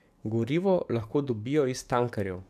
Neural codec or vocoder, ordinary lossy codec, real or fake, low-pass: codec, 44.1 kHz, 7.8 kbps, DAC; MP3, 96 kbps; fake; 14.4 kHz